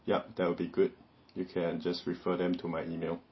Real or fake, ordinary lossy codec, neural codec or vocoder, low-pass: real; MP3, 24 kbps; none; 7.2 kHz